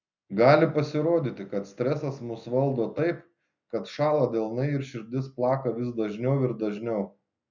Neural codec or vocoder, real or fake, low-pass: none; real; 7.2 kHz